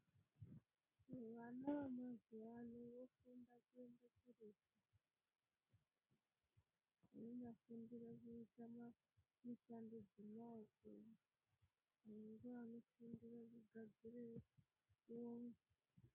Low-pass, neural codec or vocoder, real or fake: 3.6 kHz; none; real